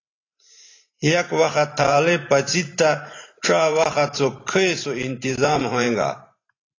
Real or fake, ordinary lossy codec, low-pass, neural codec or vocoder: fake; AAC, 32 kbps; 7.2 kHz; vocoder, 44.1 kHz, 128 mel bands every 512 samples, BigVGAN v2